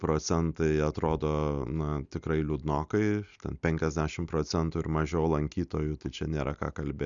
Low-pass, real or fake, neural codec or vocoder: 7.2 kHz; real; none